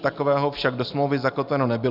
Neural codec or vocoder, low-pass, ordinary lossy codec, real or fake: none; 5.4 kHz; Opus, 64 kbps; real